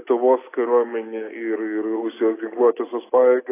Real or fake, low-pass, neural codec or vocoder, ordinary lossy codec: real; 3.6 kHz; none; AAC, 16 kbps